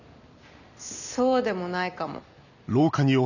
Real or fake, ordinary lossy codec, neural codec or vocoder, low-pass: real; none; none; 7.2 kHz